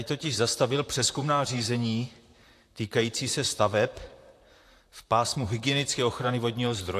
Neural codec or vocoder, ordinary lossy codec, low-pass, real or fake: vocoder, 44.1 kHz, 128 mel bands, Pupu-Vocoder; AAC, 64 kbps; 14.4 kHz; fake